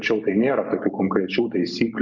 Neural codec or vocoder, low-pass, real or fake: none; 7.2 kHz; real